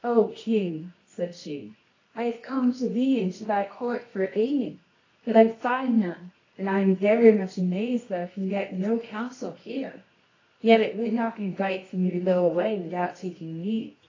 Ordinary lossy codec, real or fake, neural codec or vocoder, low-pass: AAC, 32 kbps; fake; codec, 24 kHz, 0.9 kbps, WavTokenizer, medium music audio release; 7.2 kHz